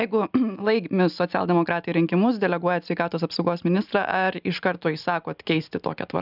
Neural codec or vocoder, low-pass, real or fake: none; 5.4 kHz; real